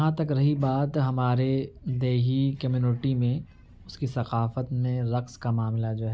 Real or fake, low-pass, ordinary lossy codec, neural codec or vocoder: real; none; none; none